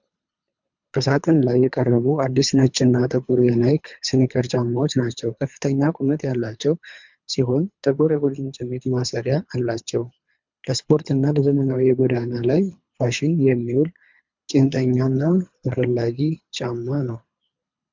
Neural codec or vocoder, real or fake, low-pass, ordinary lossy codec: codec, 24 kHz, 3 kbps, HILCodec; fake; 7.2 kHz; MP3, 64 kbps